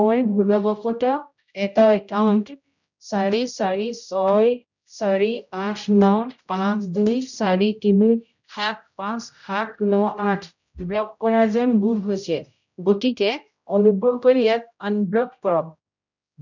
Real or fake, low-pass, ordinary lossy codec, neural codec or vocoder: fake; 7.2 kHz; none; codec, 16 kHz, 0.5 kbps, X-Codec, HuBERT features, trained on general audio